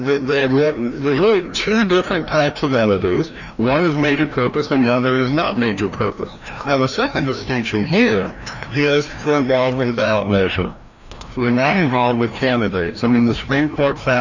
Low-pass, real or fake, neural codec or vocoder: 7.2 kHz; fake; codec, 16 kHz, 1 kbps, FreqCodec, larger model